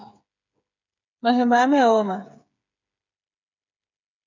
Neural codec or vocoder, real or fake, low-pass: codec, 16 kHz, 8 kbps, FreqCodec, smaller model; fake; 7.2 kHz